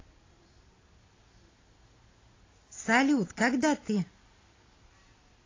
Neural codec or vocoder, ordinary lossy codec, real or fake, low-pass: none; AAC, 32 kbps; real; 7.2 kHz